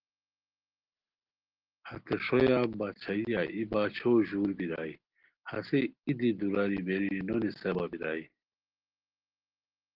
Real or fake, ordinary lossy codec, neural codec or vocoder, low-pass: real; Opus, 16 kbps; none; 5.4 kHz